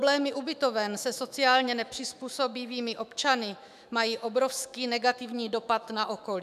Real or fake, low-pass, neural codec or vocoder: fake; 14.4 kHz; autoencoder, 48 kHz, 128 numbers a frame, DAC-VAE, trained on Japanese speech